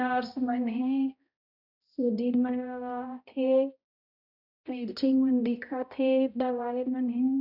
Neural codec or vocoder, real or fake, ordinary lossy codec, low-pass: codec, 16 kHz, 0.5 kbps, X-Codec, HuBERT features, trained on balanced general audio; fake; none; 5.4 kHz